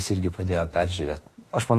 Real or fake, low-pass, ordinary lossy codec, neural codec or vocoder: fake; 14.4 kHz; AAC, 48 kbps; autoencoder, 48 kHz, 32 numbers a frame, DAC-VAE, trained on Japanese speech